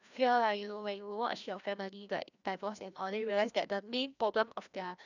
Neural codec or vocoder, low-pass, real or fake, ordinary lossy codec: codec, 16 kHz, 1 kbps, FreqCodec, larger model; 7.2 kHz; fake; none